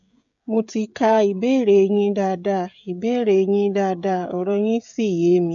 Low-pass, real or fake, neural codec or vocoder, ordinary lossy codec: 7.2 kHz; fake; codec, 16 kHz, 16 kbps, FreqCodec, smaller model; none